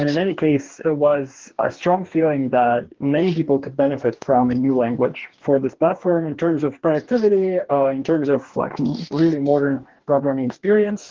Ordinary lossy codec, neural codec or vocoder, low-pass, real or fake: Opus, 16 kbps; codec, 44.1 kHz, 2.6 kbps, DAC; 7.2 kHz; fake